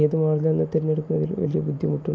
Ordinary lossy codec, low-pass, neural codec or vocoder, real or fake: none; none; none; real